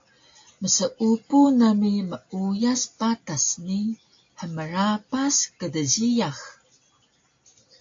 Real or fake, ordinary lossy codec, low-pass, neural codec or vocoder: real; AAC, 48 kbps; 7.2 kHz; none